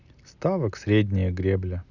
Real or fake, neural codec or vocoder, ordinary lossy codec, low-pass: real; none; none; 7.2 kHz